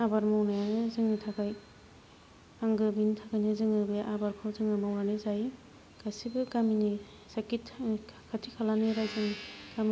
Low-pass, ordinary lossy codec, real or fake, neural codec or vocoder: none; none; real; none